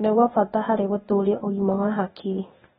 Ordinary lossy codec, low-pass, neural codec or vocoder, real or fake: AAC, 16 kbps; 7.2 kHz; codec, 16 kHz, 0.8 kbps, ZipCodec; fake